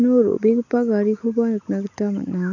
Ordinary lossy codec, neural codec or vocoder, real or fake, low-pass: none; none; real; 7.2 kHz